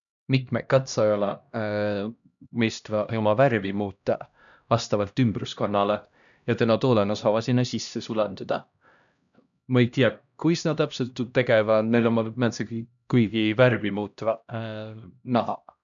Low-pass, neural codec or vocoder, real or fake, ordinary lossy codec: 7.2 kHz; codec, 16 kHz, 1 kbps, X-Codec, HuBERT features, trained on LibriSpeech; fake; none